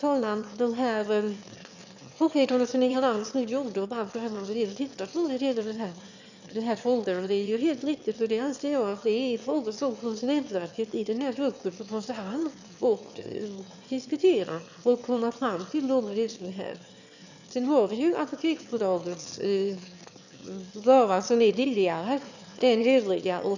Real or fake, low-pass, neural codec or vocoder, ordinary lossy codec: fake; 7.2 kHz; autoencoder, 22.05 kHz, a latent of 192 numbers a frame, VITS, trained on one speaker; none